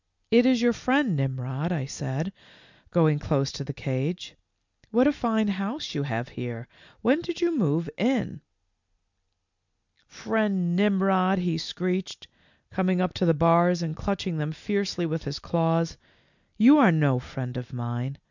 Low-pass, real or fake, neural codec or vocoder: 7.2 kHz; real; none